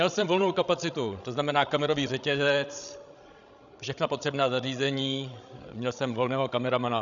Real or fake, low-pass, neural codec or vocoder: fake; 7.2 kHz; codec, 16 kHz, 16 kbps, FreqCodec, larger model